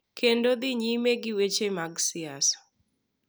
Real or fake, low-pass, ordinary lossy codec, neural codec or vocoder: real; none; none; none